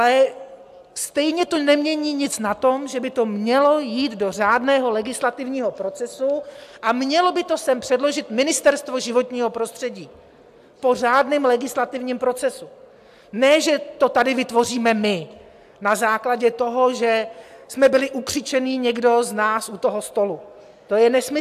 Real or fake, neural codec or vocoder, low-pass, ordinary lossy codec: real; none; 14.4 kHz; AAC, 96 kbps